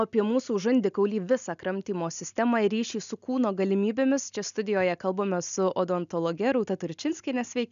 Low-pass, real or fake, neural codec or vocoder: 7.2 kHz; real; none